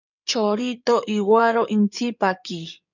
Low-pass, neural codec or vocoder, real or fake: 7.2 kHz; codec, 16 kHz in and 24 kHz out, 2.2 kbps, FireRedTTS-2 codec; fake